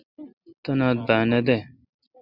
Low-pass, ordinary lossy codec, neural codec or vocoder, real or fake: 5.4 kHz; Opus, 64 kbps; none; real